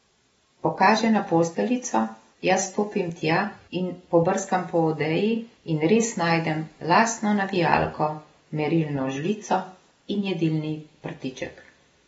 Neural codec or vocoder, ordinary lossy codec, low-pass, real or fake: none; AAC, 24 kbps; 14.4 kHz; real